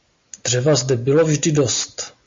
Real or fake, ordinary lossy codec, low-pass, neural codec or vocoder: real; MP3, 48 kbps; 7.2 kHz; none